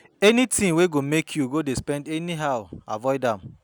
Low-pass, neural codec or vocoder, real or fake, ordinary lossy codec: none; none; real; none